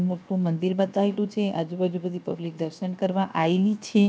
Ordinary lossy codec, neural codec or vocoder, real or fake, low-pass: none; codec, 16 kHz, 0.7 kbps, FocalCodec; fake; none